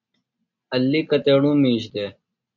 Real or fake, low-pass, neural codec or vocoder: real; 7.2 kHz; none